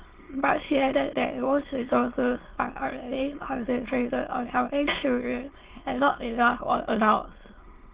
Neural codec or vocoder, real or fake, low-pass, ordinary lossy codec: autoencoder, 22.05 kHz, a latent of 192 numbers a frame, VITS, trained on many speakers; fake; 3.6 kHz; Opus, 16 kbps